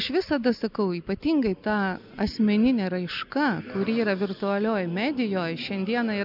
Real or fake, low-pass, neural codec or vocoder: real; 5.4 kHz; none